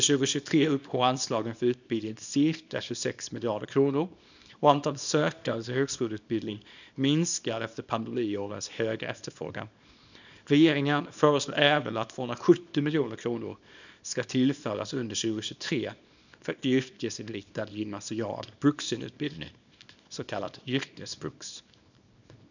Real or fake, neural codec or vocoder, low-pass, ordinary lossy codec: fake; codec, 24 kHz, 0.9 kbps, WavTokenizer, small release; 7.2 kHz; none